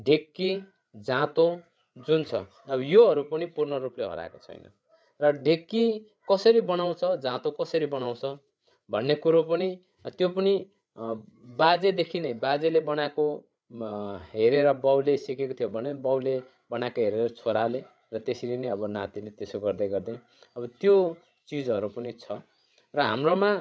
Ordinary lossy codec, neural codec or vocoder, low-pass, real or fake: none; codec, 16 kHz, 8 kbps, FreqCodec, larger model; none; fake